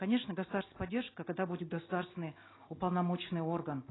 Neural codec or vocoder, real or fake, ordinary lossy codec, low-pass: none; real; AAC, 16 kbps; 7.2 kHz